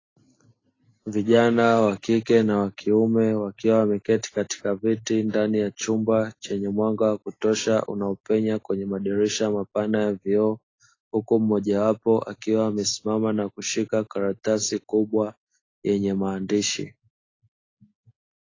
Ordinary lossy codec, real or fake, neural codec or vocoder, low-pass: AAC, 32 kbps; real; none; 7.2 kHz